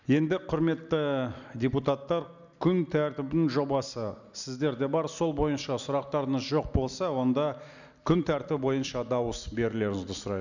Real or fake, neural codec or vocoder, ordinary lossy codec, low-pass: real; none; none; 7.2 kHz